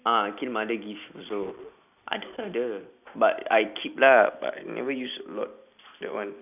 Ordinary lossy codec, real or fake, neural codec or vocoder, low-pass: none; real; none; 3.6 kHz